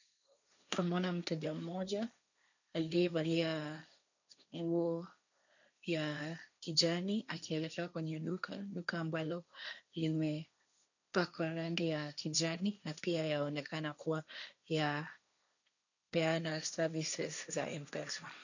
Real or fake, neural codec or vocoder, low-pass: fake; codec, 16 kHz, 1.1 kbps, Voila-Tokenizer; 7.2 kHz